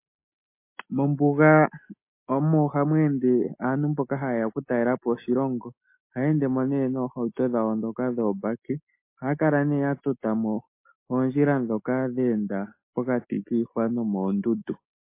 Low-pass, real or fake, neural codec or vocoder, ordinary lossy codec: 3.6 kHz; real; none; MP3, 24 kbps